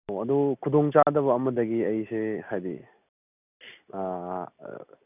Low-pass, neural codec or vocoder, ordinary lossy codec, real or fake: 3.6 kHz; none; none; real